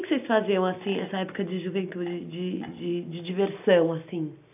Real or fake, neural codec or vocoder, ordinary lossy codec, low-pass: real; none; AAC, 32 kbps; 3.6 kHz